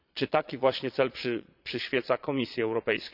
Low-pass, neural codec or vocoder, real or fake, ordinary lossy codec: 5.4 kHz; vocoder, 22.05 kHz, 80 mel bands, Vocos; fake; none